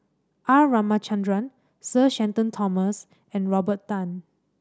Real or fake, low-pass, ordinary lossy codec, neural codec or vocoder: real; none; none; none